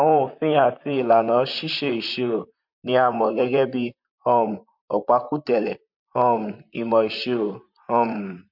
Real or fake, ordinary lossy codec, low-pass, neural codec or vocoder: fake; MP3, 48 kbps; 5.4 kHz; vocoder, 44.1 kHz, 128 mel bands, Pupu-Vocoder